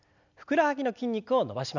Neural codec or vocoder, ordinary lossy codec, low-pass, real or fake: none; none; 7.2 kHz; real